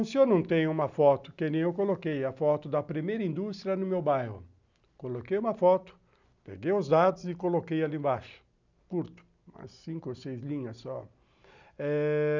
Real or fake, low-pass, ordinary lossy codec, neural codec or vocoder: real; 7.2 kHz; none; none